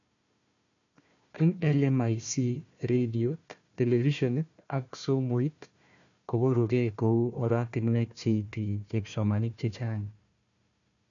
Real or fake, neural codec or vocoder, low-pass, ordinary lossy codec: fake; codec, 16 kHz, 1 kbps, FunCodec, trained on Chinese and English, 50 frames a second; 7.2 kHz; none